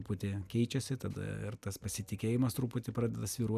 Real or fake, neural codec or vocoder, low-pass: real; none; 14.4 kHz